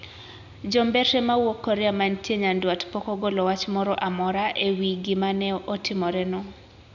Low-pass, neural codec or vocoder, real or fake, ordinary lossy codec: 7.2 kHz; none; real; none